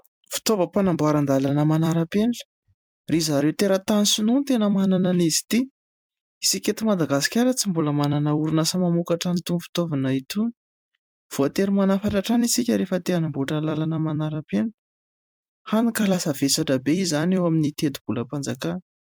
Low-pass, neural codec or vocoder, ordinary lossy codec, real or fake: 19.8 kHz; vocoder, 44.1 kHz, 128 mel bands every 256 samples, BigVGAN v2; MP3, 96 kbps; fake